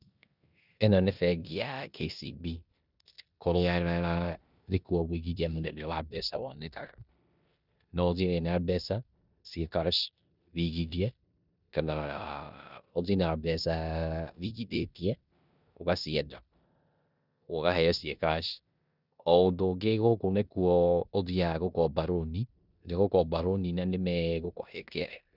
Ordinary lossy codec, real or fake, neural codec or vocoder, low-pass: none; fake; codec, 16 kHz in and 24 kHz out, 0.9 kbps, LongCat-Audio-Codec, four codebook decoder; 5.4 kHz